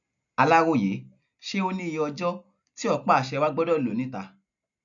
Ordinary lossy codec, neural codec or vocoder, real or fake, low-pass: none; none; real; 7.2 kHz